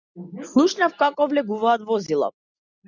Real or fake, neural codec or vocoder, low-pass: real; none; 7.2 kHz